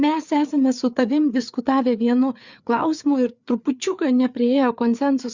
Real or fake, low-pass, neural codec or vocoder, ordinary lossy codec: fake; 7.2 kHz; codec, 16 kHz, 4 kbps, FreqCodec, larger model; Opus, 64 kbps